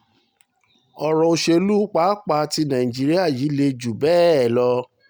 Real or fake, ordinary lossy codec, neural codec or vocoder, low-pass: real; none; none; none